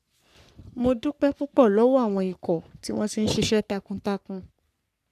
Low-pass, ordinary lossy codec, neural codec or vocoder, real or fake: 14.4 kHz; none; codec, 44.1 kHz, 3.4 kbps, Pupu-Codec; fake